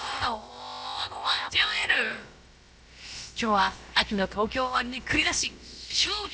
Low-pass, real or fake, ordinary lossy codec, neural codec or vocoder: none; fake; none; codec, 16 kHz, about 1 kbps, DyCAST, with the encoder's durations